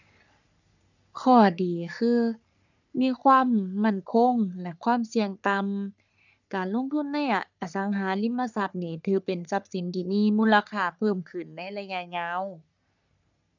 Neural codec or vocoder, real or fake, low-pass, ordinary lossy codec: codec, 44.1 kHz, 3.4 kbps, Pupu-Codec; fake; 7.2 kHz; none